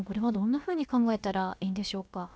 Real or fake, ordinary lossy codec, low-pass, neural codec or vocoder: fake; none; none; codec, 16 kHz, about 1 kbps, DyCAST, with the encoder's durations